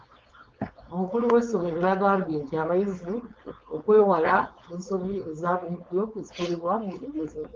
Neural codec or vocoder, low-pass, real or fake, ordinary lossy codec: codec, 16 kHz, 4.8 kbps, FACodec; 7.2 kHz; fake; Opus, 24 kbps